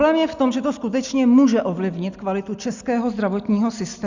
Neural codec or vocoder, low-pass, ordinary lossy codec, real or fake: none; 7.2 kHz; Opus, 64 kbps; real